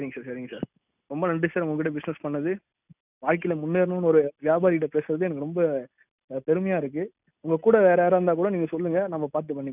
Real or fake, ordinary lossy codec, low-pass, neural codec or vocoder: real; none; 3.6 kHz; none